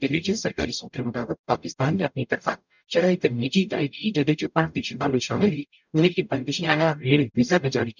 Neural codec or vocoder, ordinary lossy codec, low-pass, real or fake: codec, 44.1 kHz, 0.9 kbps, DAC; none; 7.2 kHz; fake